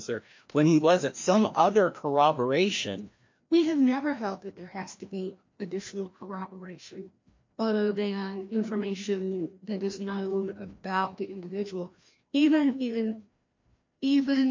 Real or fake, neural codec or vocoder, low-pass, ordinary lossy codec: fake; codec, 16 kHz, 1 kbps, FreqCodec, larger model; 7.2 kHz; MP3, 48 kbps